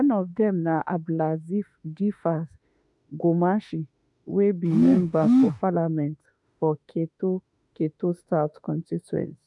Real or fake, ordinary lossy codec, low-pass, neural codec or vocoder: fake; MP3, 96 kbps; 10.8 kHz; autoencoder, 48 kHz, 32 numbers a frame, DAC-VAE, trained on Japanese speech